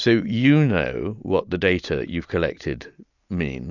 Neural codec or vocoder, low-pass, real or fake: none; 7.2 kHz; real